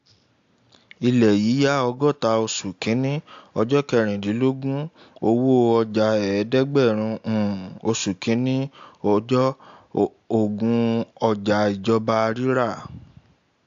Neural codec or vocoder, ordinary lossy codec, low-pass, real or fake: none; AAC, 64 kbps; 7.2 kHz; real